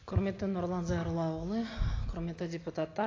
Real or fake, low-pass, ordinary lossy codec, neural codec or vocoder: real; 7.2 kHz; AAC, 32 kbps; none